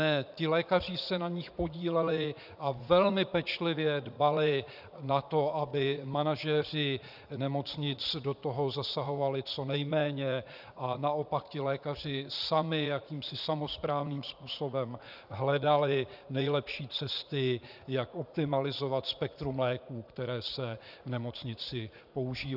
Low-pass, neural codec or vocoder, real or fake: 5.4 kHz; vocoder, 44.1 kHz, 80 mel bands, Vocos; fake